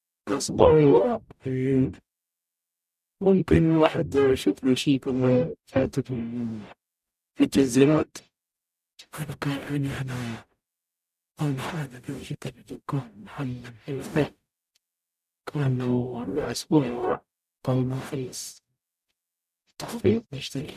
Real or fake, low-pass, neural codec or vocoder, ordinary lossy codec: fake; 14.4 kHz; codec, 44.1 kHz, 0.9 kbps, DAC; none